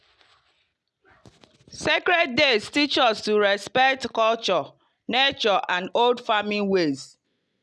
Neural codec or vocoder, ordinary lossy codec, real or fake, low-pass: none; none; real; none